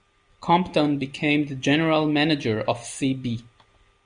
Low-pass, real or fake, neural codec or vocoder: 9.9 kHz; real; none